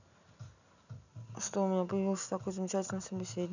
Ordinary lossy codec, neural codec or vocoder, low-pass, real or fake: none; none; 7.2 kHz; real